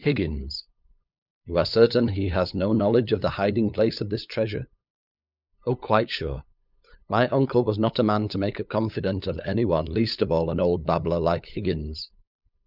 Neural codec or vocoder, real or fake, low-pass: codec, 16 kHz, 8 kbps, FunCodec, trained on LibriTTS, 25 frames a second; fake; 5.4 kHz